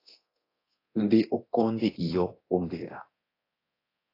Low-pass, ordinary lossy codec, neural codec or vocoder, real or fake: 5.4 kHz; AAC, 24 kbps; codec, 24 kHz, 0.9 kbps, DualCodec; fake